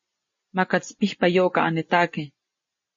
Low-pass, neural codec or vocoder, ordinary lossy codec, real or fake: 7.2 kHz; none; MP3, 32 kbps; real